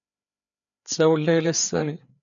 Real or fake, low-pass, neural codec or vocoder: fake; 7.2 kHz; codec, 16 kHz, 4 kbps, FreqCodec, larger model